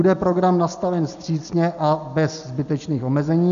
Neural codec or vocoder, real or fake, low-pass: none; real; 7.2 kHz